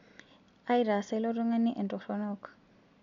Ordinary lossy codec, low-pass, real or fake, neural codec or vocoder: none; 7.2 kHz; real; none